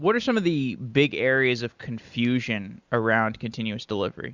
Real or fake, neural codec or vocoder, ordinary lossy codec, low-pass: real; none; Opus, 64 kbps; 7.2 kHz